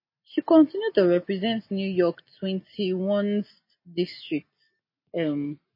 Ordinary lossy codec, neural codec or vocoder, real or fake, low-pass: MP3, 24 kbps; none; real; 5.4 kHz